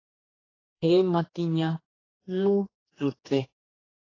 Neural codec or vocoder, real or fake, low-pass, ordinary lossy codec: codec, 16 kHz, 2 kbps, X-Codec, HuBERT features, trained on general audio; fake; 7.2 kHz; AAC, 32 kbps